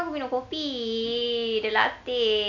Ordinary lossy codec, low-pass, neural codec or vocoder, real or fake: none; 7.2 kHz; none; real